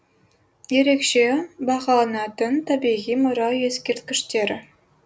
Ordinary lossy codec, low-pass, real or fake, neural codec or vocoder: none; none; real; none